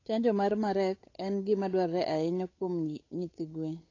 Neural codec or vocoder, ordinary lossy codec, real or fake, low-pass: codec, 16 kHz, 8 kbps, FunCodec, trained on Chinese and English, 25 frames a second; AAC, 32 kbps; fake; 7.2 kHz